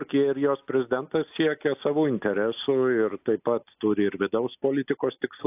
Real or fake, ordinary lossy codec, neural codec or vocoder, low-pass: real; AAC, 32 kbps; none; 3.6 kHz